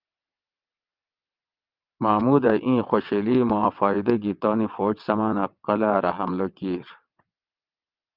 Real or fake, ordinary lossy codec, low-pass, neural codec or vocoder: fake; Opus, 24 kbps; 5.4 kHz; vocoder, 22.05 kHz, 80 mel bands, WaveNeXt